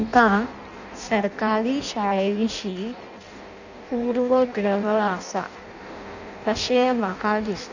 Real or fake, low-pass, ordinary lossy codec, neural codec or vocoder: fake; 7.2 kHz; none; codec, 16 kHz in and 24 kHz out, 0.6 kbps, FireRedTTS-2 codec